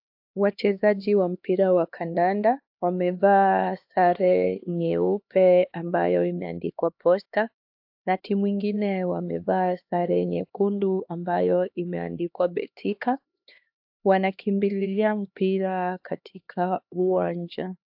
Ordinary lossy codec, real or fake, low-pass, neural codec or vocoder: AAC, 48 kbps; fake; 5.4 kHz; codec, 16 kHz, 2 kbps, X-Codec, HuBERT features, trained on LibriSpeech